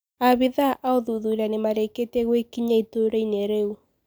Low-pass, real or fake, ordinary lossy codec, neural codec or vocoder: none; real; none; none